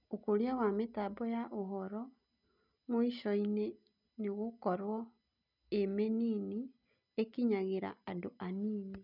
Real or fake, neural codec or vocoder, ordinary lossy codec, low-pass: real; none; none; 5.4 kHz